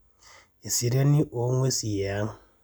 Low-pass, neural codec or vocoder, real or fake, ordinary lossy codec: none; none; real; none